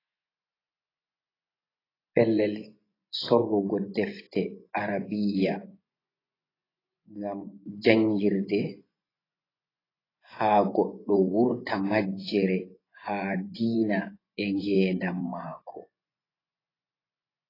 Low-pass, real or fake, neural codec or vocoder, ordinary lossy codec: 5.4 kHz; fake; vocoder, 24 kHz, 100 mel bands, Vocos; AAC, 24 kbps